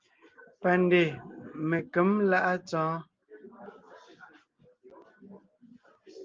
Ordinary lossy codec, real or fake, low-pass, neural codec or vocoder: Opus, 24 kbps; real; 7.2 kHz; none